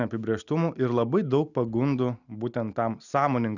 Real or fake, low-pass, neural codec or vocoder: real; 7.2 kHz; none